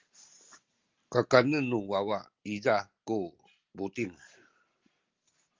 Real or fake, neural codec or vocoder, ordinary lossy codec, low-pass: real; none; Opus, 32 kbps; 7.2 kHz